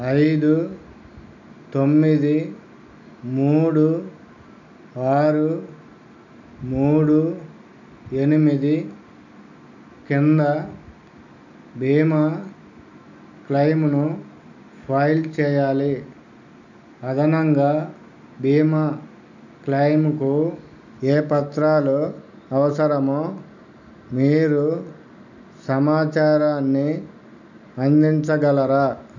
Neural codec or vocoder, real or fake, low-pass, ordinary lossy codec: none; real; 7.2 kHz; none